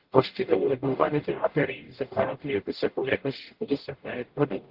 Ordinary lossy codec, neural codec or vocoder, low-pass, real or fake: Opus, 16 kbps; codec, 44.1 kHz, 0.9 kbps, DAC; 5.4 kHz; fake